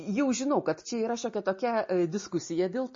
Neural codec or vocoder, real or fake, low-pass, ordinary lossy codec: none; real; 7.2 kHz; MP3, 32 kbps